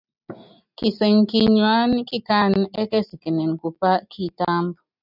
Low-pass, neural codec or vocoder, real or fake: 5.4 kHz; none; real